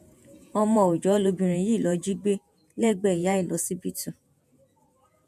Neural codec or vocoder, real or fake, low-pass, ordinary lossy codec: vocoder, 48 kHz, 128 mel bands, Vocos; fake; 14.4 kHz; none